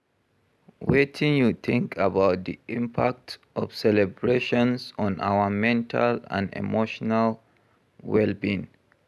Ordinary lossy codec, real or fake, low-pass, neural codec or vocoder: none; real; none; none